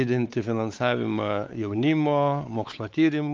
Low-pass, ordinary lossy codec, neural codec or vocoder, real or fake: 7.2 kHz; Opus, 24 kbps; codec, 16 kHz, 4 kbps, X-Codec, WavLM features, trained on Multilingual LibriSpeech; fake